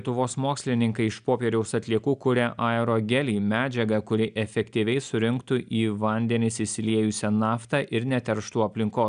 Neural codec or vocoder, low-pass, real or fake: none; 9.9 kHz; real